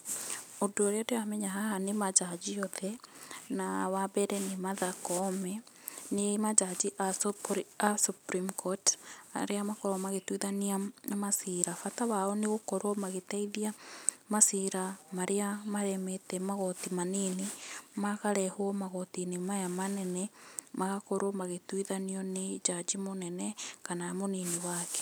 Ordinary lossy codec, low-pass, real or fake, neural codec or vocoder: none; none; real; none